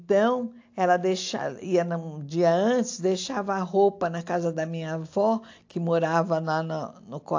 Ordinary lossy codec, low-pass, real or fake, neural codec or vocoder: AAC, 48 kbps; 7.2 kHz; real; none